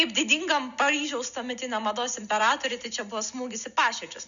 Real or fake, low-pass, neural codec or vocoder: real; 7.2 kHz; none